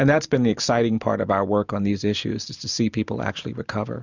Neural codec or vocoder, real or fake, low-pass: none; real; 7.2 kHz